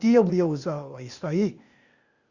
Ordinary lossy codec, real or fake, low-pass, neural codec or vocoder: Opus, 64 kbps; fake; 7.2 kHz; codec, 16 kHz, 0.8 kbps, ZipCodec